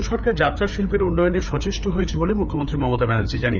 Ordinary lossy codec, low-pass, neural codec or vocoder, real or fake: none; 7.2 kHz; codec, 16 kHz, 4 kbps, FreqCodec, larger model; fake